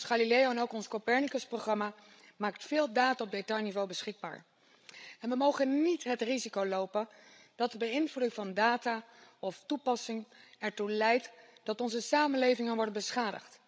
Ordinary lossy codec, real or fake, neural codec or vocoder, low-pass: none; fake; codec, 16 kHz, 16 kbps, FreqCodec, larger model; none